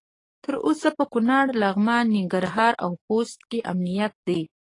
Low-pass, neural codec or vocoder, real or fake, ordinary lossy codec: 10.8 kHz; codec, 44.1 kHz, 7.8 kbps, DAC; fake; AAC, 32 kbps